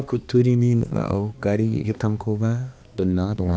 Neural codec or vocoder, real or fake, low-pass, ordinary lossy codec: codec, 16 kHz, 2 kbps, X-Codec, HuBERT features, trained on balanced general audio; fake; none; none